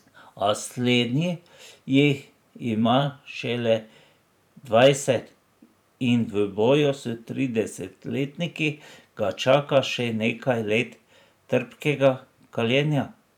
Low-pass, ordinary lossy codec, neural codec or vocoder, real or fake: 19.8 kHz; none; vocoder, 44.1 kHz, 128 mel bands every 512 samples, BigVGAN v2; fake